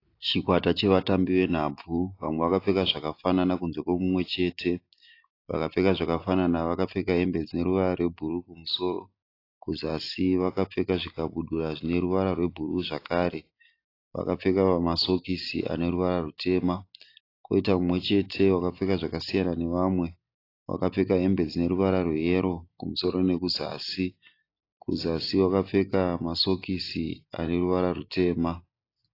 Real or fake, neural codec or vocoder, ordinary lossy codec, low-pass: real; none; AAC, 32 kbps; 5.4 kHz